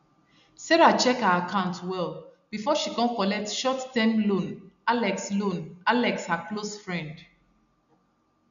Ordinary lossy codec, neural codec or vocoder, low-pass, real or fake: none; none; 7.2 kHz; real